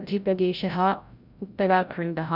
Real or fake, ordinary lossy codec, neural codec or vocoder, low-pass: fake; none; codec, 16 kHz, 0.5 kbps, FreqCodec, larger model; 5.4 kHz